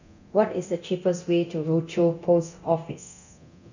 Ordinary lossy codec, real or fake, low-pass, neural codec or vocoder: none; fake; 7.2 kHz; codec, 24 kHz, 0.9 kbps, DualCodec